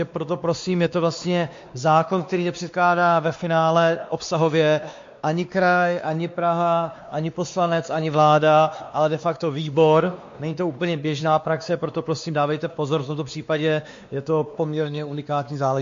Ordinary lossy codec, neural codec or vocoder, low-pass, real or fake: MP3, 48 kbps; codec, 16 kHz, 2 kbps, X-Codec, WavLM features, trained on Multilingual LibriSpeech; 7.2 kHz; fake